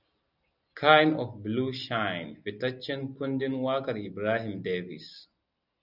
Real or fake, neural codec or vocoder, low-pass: real; none; 5.4 kHz